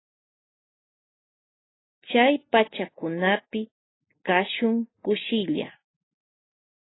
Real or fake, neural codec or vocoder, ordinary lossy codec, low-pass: real; none; AAC, 16 kbps; 7.2 kHz